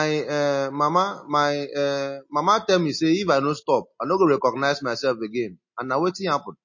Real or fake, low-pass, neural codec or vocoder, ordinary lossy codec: real; 7.2 kHz; none; MP3, 32 kbps